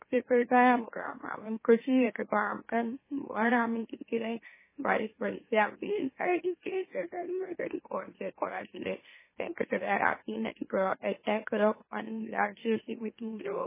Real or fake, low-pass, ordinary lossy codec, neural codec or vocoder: fake; 3.6 kHz; MP3, 16 kbps; autoencoder, 44.1 kHz, a latent of 192 numbers a frame, MeloTTS